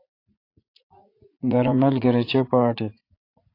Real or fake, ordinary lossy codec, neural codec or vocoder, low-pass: fake; AAC, 32 kbps; vocoder, 44.1 kHz, 80 mel bands, Vocos; 5.4 kHz